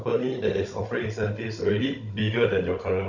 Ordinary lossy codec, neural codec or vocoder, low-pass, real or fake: none; codec, 16 kHz, 16 kbps, FunCodec, trained on Chinese and English, 50 frames a second; 7.2 kHz; fake